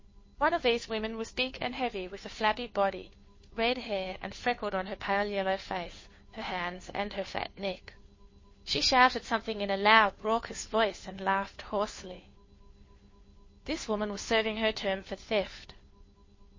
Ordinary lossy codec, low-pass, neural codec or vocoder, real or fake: MP3, 32 kbps; 7.2 kHz; codec, 16 kHz, 2 kbps, FunCodec, trained on Chinese and English, 25 frames a second; fake